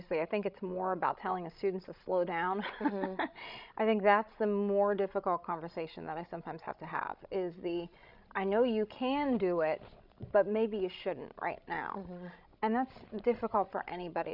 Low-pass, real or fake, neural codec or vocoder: 5.4 kHz; fake; codec, 16 kHz, 16 kbps, FreqCodec, larger model